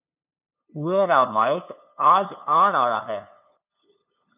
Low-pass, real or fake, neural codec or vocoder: 3.6 kHz; fake; codec, 16 kHz, 2 kbps, FunCodec, trained on LibriTTS, 25 frames a second